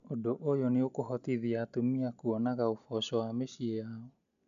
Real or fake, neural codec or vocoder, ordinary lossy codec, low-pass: real; none; none; 7.2 kHz